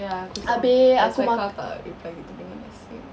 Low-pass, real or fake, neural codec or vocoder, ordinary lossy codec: none; real; none; none